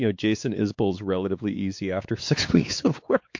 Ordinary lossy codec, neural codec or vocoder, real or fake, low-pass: MP3, 48 kbps; codec, 16 kHz, 4 kbps, X-Codec, WavLM features, trained on Multilingual LibriSpeech; fake; 7.2 kHz